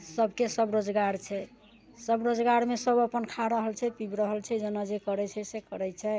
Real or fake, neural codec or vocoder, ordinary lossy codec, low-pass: real; none; none; none